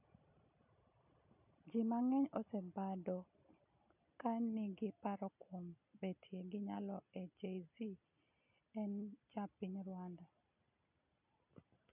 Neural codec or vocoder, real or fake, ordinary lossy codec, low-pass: none; real; none; 3.6 kHz